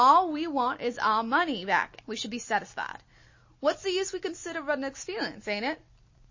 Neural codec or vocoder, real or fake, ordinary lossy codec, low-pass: none; real; MP3, 32 kbps; 7.2 kHz